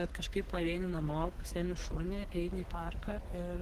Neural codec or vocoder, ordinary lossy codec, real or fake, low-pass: codec, 32 kHz, 1.9 kbps, SNAC; Opus, 16 kbps; fake; 14.4 kHz